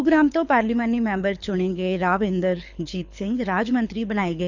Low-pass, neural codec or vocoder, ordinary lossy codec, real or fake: 7.2 kHz; codec, 24 kHz, 6 kbps, HILCodec; none; fake